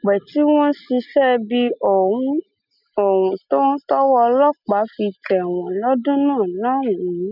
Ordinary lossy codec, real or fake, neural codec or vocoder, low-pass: none; real; none; 5.4 kHz